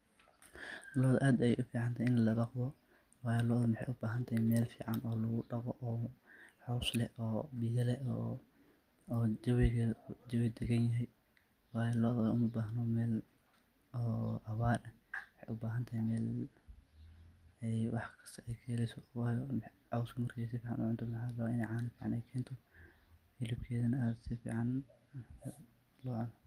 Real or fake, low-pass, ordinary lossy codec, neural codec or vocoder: real; 14.4 kHz; Opus, 32 kbps; none